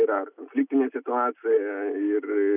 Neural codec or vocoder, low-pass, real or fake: none; 3.6 kHz; real